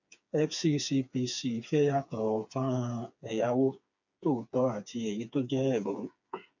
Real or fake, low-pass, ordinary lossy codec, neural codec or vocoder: fake; 7.2 kHz; none; codec, 16 kHz, 4 kbps, FreqCodec, smaller model